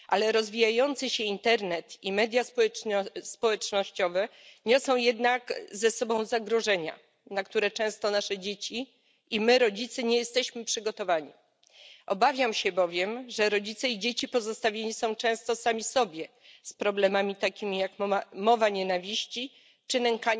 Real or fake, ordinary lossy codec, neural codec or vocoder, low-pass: real; none; none; none